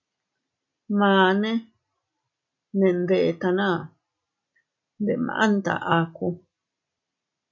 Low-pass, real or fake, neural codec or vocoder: 7.2 kHz; real; none